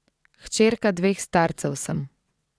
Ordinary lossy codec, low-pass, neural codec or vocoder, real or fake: none; none; vocoder, 22.05 kHz, 80 mel bands, WaveNeXt; fake